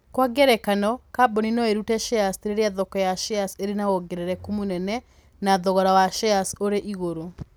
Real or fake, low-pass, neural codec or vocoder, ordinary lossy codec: real; none; none; none